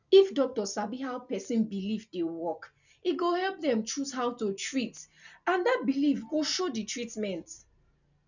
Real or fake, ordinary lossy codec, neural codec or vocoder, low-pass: real; none; none; 7.2 kHz